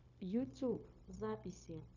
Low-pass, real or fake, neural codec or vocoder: 7.2 kHz; fake; codec, 16 kHz, 0.9 kbps, LongCat-Audio-Codec